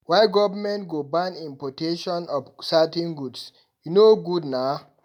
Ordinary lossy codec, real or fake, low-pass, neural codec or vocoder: none; real; 19.8 kHz; none